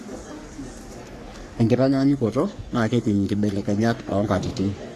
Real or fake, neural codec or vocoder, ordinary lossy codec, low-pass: fake; codec, 44.1 kHz, 3.4 kbps, Pupu-Codec; AAC, 64 kbps; 14.4 kHz